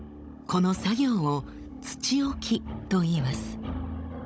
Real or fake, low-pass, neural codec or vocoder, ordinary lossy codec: fake; none; codec, 16 kHz, 16 kbps, FunCodec, trained on Chinese and English, 50 frames a second; none